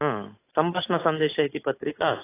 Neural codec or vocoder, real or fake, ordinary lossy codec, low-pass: none; real; AAC, 16 kbps; 3.6 kHz